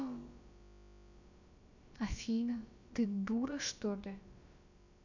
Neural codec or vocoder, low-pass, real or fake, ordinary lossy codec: codec, 16 kHz, about 1 kbps, DyCAST, with the encoder's durations; 7.2 kHz; fake; none